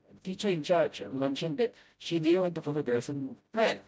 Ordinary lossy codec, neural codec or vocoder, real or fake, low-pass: none; codec, 16 kHz, 0.5 kbps, FreqCodec, smaller model; fake; none